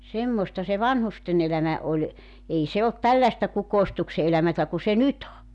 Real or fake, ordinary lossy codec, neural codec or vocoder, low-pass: real; none; none; 10.8 kHz